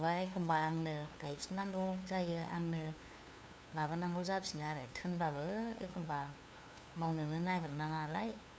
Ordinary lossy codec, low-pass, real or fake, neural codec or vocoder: none; none; fake; codec, 16 kHz, 2 kbps, FunCodec, trained on LibriTTS, 25 frames a second